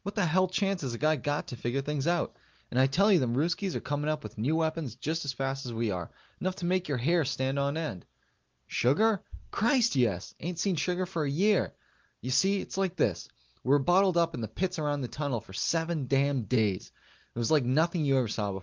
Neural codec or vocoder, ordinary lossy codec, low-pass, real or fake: none; Opus, 24 kbps; 7.2 kHz; real